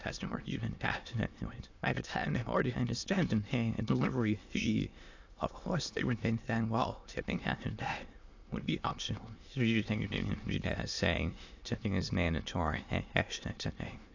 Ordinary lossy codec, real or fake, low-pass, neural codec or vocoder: AAC, 48 kbps; fake; 7.2 kHz; autoencoder, 22.05 kHz, a latent of 192 numbers a frame, VITS, trained on many speakers